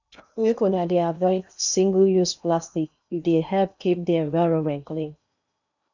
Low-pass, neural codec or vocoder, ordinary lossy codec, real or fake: 7.2 kHz; codec, 16 kHz in and 24 kHz out, 0.8 kbps, FocalCodec, streaming, 65536 codes; none; fake